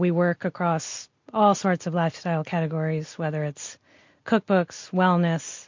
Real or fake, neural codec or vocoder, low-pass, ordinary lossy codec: real; none; 7.2 kHz; MP3, 48 kbps